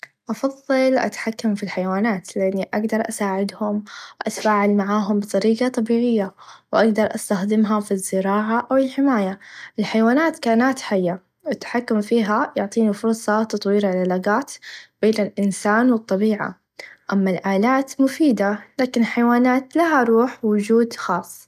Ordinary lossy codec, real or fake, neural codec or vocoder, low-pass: none; real; none; 14.4 kHz